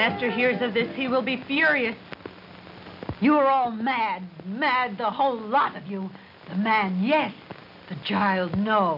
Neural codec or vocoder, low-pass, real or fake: none; 5.4 kHz; real